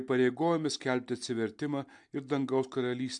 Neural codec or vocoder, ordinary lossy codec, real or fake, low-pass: none; MP3, 64 kbps; real; 10.8 kHz